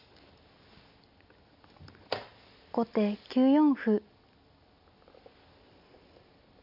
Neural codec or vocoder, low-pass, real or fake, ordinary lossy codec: none; 5.4 kHz; real; none